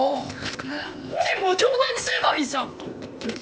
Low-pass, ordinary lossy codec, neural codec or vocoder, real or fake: none; none; codec, 16 kHz, 0.8 kbps, ZipCodec; fake